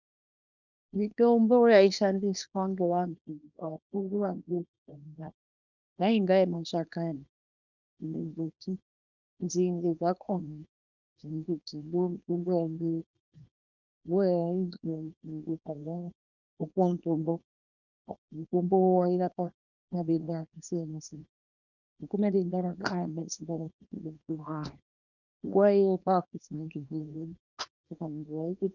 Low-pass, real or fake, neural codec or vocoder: 7.2 kHz; fake; codec, 24 kHz, 0.9 kbps, WavTokenizer, small release